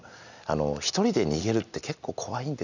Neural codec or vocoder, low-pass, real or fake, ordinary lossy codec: none; 7.2 kHz; real; none